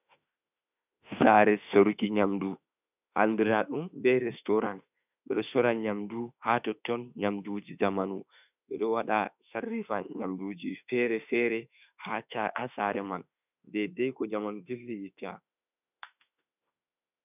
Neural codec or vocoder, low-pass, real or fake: autoencoder, 48 kHz, 32 numbers a frame, DAC-VAE, trained on Japanese speech; 3.6 kHz; fake